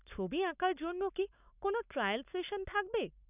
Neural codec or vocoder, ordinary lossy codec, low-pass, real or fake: codec, 44.1 kHz, 7.8 kbps, Pupu-Codec; none; 3.6 kHz; fake